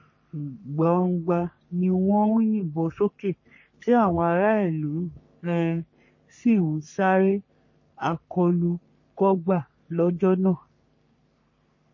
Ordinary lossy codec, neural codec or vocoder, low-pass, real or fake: MP3, 32 kbps; codec, 44.1 kHz, 3.4 kbps, Pupu-Codec; 7.2 kHz; fake